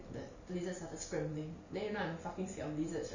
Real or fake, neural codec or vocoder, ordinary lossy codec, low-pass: real; none; none; 7.2 kHz